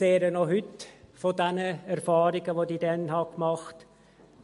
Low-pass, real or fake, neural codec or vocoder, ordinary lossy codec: 14.4 kHz; real; none; MP3, 48 kbps